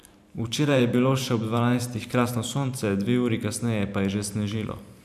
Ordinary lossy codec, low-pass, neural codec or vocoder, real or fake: none; 14.4 kHz; vocoder, 44.1 kHz, 128 mel bands every 512 samples, BigVGAN v2; fake